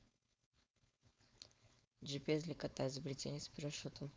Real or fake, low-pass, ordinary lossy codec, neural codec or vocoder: fake; none; none; codec, 16 kHz, 4.8 kbps, FACodec